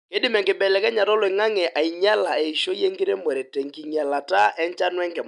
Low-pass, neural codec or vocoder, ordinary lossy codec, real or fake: 10.8 kHz; none; MP3, 96 kbps; real